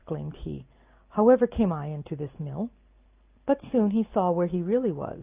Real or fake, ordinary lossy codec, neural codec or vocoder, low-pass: real; Opus, 24 kbps; none; 3.6 kHz